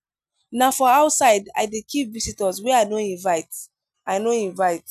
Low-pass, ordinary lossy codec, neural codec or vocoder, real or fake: 14.4 kHz; none; none; real